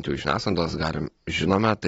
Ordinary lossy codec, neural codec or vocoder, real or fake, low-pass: AAC, 24 kbps; none; real; 9.9 kHz